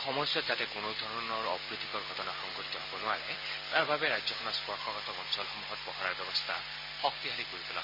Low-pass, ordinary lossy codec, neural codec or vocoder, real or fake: 5.4 kHz; MP3, 24 kbps; none; real